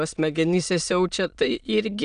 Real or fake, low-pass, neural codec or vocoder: fake; 9.9 kHz; autoencoder, 22.05 kHz, a latent of 192 numbers a frame, VITS, trained on many speakers